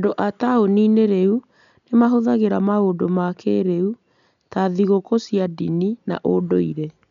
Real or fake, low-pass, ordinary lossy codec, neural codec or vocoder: real; 7.2 kHz; none; none